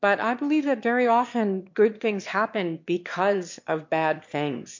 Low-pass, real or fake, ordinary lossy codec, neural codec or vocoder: 7.2 kHz; fake; MP3, 48 kbps; autoencoder, 22.05 kHz, a latent of 192 numbers a frame, VITS, trained on one speaker